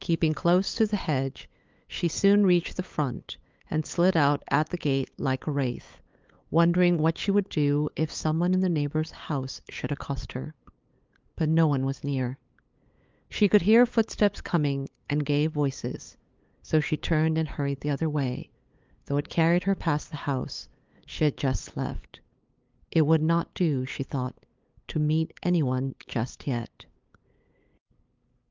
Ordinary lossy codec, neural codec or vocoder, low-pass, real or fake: Opus, 24 kbps; codec, 16 kHz, 8 kbps, FunCodec, trained on LibriTTS, 25 frames a second; 7.2 kHz; fake